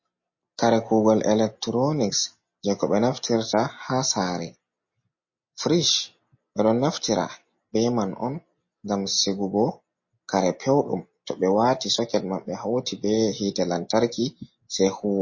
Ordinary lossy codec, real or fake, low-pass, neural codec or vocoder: MP3, 32 kbps; real; 7.2 kHz; none